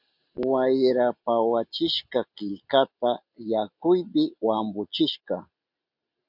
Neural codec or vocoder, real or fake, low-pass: none; real; 5.4 kHz